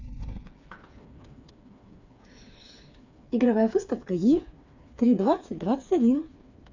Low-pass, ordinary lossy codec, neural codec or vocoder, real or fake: 7.2 kHz; AAC, 48 kbps; codec, 16 kHz, 4 kbps, FreqCodec, smaller model; fake